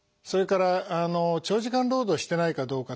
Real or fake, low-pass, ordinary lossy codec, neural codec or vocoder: real; none; none; none